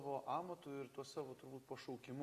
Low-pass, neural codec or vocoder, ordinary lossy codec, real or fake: 14.4 kHz; none; Opus, 64 kbps; real